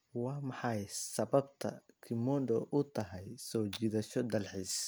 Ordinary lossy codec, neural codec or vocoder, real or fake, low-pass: none; none; real; none